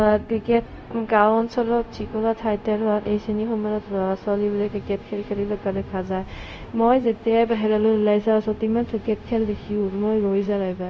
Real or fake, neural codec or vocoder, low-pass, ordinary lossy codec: fake; codec, 16 kHz, 0.4 kbps, LongCat-Audio-Codec; none; none